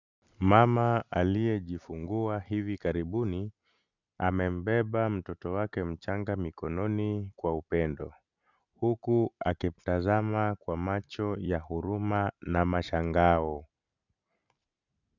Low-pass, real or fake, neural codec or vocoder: 7.2 kHz; real; none